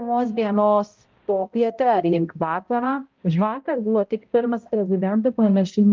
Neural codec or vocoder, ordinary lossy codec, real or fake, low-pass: codec, 16 kHz, 0.5 kbps, X-Codec, HuBERT features, trained on balanced general audio; Opus, 32 kbps; fake; 7.2 kHz